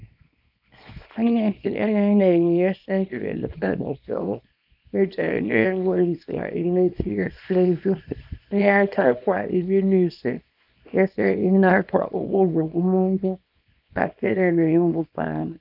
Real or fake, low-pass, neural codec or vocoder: fake; 5.4 kHz; codec, 24 kHz, 0.9 kbps, WavTokenizer, small release